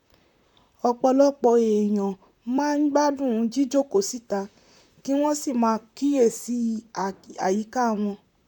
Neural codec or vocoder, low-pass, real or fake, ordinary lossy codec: vocoder, 44.1 kHz, 128 mel bands, Pupu-Vocoder; 19.8 kHz; fake; none